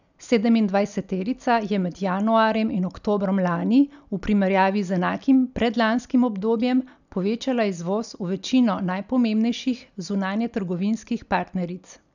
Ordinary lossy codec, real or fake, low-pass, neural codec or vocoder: none; real; 7.2 kHz; none